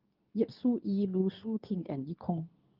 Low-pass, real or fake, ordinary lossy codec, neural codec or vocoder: 5.4 kHz; fake; Opus, 24 kbps; codec, 24 kHz, 0.9 kbps, WavTokenizer, medium speech release version 2